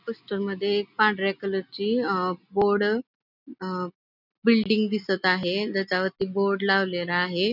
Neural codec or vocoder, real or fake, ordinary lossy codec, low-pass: none; real; none; 5.4 kHz